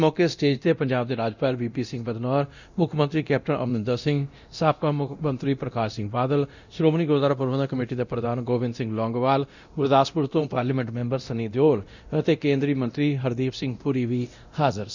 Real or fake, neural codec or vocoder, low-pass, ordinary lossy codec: fake; codec, 24 kHz, 0.9 kbps, DualCodec; 7.2 kHz; none